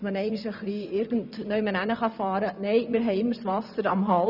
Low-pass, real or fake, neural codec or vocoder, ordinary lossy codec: 5.4 kHz; fake; vocoder, 24 kHz, 100 mel bands, Vocos; none